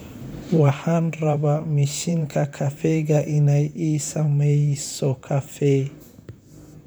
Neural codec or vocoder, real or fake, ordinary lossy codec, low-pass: vocoder, 44.1 kHz, 128 mel bands, Pupu-Vocoder; fake; none; none